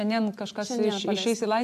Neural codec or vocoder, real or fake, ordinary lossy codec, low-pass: none; real; MP3, 64 kbps; 14.4 kHz